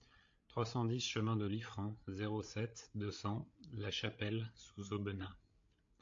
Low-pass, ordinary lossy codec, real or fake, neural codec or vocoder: 7.2 kHz; AAC, 48 kbps; fake; codec, 16 kHz, 8 kbps, FreqCodec, larger model